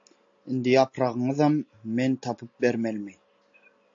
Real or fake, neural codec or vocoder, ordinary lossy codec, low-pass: real; none; AAC, 48 kbps; 7.2 kHz